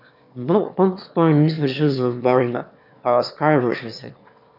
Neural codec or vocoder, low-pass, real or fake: autoencoder, 22.05 kHz, a latent of 192 numbers a frame, VITS, trained on one speaker; 5.4 kHz; fake